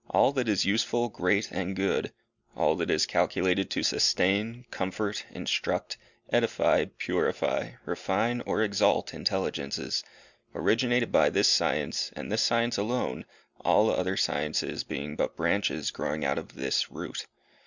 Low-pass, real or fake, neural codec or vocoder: 7.2 kHz; real; none